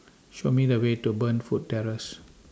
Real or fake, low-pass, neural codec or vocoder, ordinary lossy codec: real; none; none; none